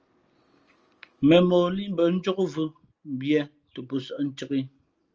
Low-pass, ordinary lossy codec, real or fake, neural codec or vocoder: 7.2 kHz; Opus, 24 kbps; real; none